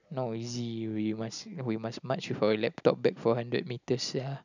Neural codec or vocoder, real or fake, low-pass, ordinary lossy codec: none; real; 7.2 kHz; none